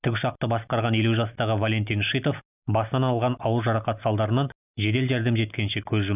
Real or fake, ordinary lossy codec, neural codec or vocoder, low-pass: real; none; none; 3.6 kHz